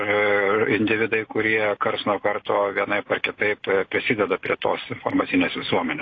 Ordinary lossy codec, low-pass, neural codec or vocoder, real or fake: AAC, 32 kbps; 7.2 kHz; none; real